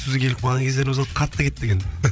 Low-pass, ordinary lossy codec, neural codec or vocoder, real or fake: none; none; codec, 16 kHz, 8 kbps, FreqCodec, larger model; fake